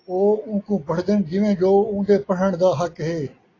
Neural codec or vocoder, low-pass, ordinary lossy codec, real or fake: none; 7.2 kHz; AAC, 32 kbps; real